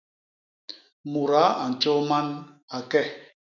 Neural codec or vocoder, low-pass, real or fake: autoencoder, 48 kHz, 128 numbers a frame, DAC-VAE, trained on Japanese speech; 7.2 kHz; fake